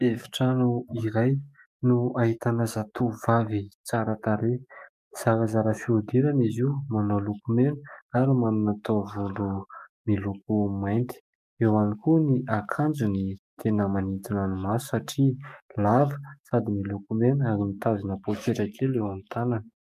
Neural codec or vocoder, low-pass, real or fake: codec, 44.1 kHz, 7.8 kbps, DAC; 14.4 kHz; fake